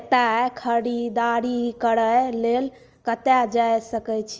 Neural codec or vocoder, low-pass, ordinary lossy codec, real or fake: none; 7.2 kHz; Opus, 24 kbps; real